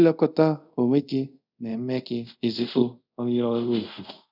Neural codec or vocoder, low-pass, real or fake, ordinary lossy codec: codec, 24 kHz, 0.5 kbps, DualCodec; 5.4 kHz; fake; none